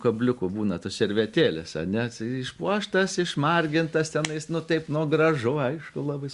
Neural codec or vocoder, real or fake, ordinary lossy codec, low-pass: none; real; Opus, 64 kbps; 10.8 kHz